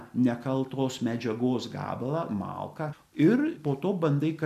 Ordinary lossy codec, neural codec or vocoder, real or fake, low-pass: MP3, 96 kbps; none; real; 14.4 kHz